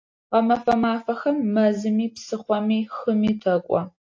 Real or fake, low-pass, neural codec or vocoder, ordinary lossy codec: real; 7.2 kHz; none; AAC, 48 kbps